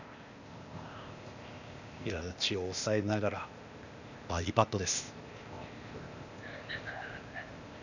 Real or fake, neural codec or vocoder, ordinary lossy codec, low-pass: fake; codec, 16 kHz, 0.8 kbps, ZipCodec; none; 7.2 kHz